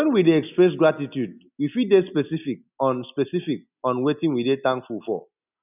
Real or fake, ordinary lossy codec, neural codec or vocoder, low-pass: real; none; none; 3.6 kHz